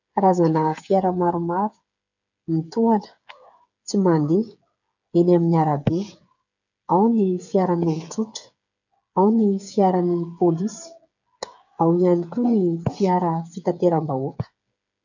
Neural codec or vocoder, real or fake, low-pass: codec, 16 kHz, 8 kbps, FreqCodec, smaller model; fake; 7.2 kHz